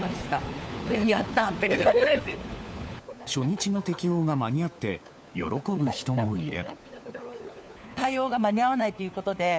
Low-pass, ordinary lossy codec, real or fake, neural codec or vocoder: none; none; fake; codec, 16 kHz, 4 kbps, FunCodec, trained on LibriTTS, 50 frames a second